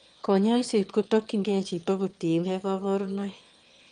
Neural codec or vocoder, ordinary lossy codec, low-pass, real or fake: autoencoder, 22.05 kHz, a latent of 192 numbers a frame, VITS, trained on one speaker; Opus, 32 kbps; 9.9 kHz; fake